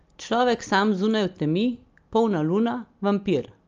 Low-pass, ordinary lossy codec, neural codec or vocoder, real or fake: 7.2 kHz; Opus, 24 kbps; none; real